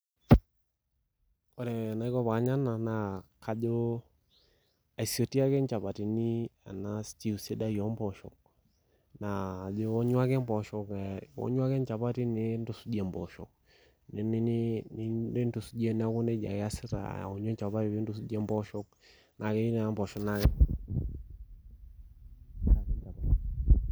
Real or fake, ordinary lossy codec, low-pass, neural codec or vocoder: real; none; none; none